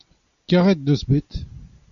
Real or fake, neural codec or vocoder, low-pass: real; none; 7.2 kHz